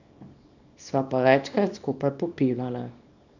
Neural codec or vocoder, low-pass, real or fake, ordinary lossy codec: codec, 24 kHz, 0.9 kbps, WavTokenizer, small release; 7.2 kHz; fake; none